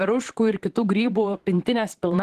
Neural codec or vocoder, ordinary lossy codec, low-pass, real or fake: vocoder, 44.1 kHz, 128 mel bands, Pupu-Vocoder; Opus, 16 kbps; 14.4 kHz; fake